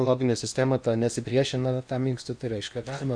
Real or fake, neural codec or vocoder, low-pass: fake; codec, 16 kHz in and 24 kHz out, 0.8 kbps, FocalCodec, streaming, 65536 codes; 9.9 kHz